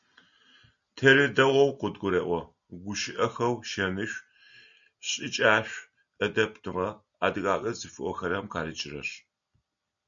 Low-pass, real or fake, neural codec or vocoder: 7.2 kHz; real; none